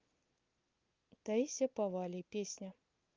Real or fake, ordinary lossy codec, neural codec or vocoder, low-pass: real; Opus, 24 kbps; none; 7.2 kHz